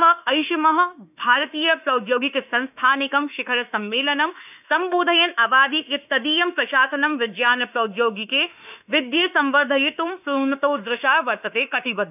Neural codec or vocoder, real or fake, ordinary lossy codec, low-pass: autoencoder, 48 kHz, 32 numbers a frame, DAC-VAE, trained on Japanese speech; fake; none; 3.6 kHz